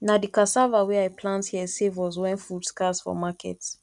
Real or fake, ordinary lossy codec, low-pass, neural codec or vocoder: real; none; 10.8 kHz; none